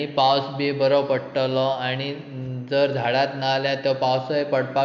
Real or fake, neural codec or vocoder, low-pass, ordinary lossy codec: real; none; 7.2 kHz; MP3, 64 kbps